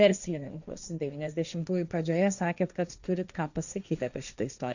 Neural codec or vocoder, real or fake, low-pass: codec, 16 kHz, 1.1 kbps, Voila-Tokenizer; fake; 7.2 kHz